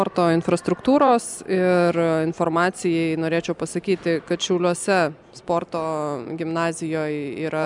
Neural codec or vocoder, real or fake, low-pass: none; real; 10.8 kHz